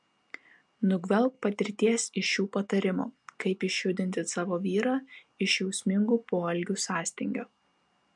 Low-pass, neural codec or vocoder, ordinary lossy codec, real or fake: 10.8 kHz; none; MP3, 64 kbps; real